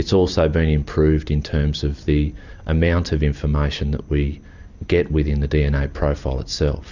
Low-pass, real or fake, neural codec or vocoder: 7.2 kHz; fake; vocoder, 44.1 kHz, 128 mel bands every 256 samples, BigVGAN v2